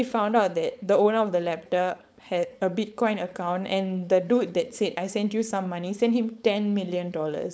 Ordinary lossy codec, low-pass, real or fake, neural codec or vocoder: none; none; fake; codec, 16 kHz, 4.8 kbps, FACodec